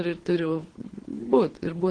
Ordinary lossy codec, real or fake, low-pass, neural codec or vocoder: Opus, 16 kbps; fake; 9.9 kHz; codec, 24 kHz, 3 kbps, HILCodec